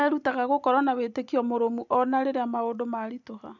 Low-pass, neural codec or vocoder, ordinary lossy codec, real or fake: 7.2 kHz; none; none; real